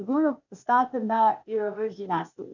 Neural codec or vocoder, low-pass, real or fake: codec, 16 kHz, 0.8 kbps, ZipCodec; 7.2 kHz; fake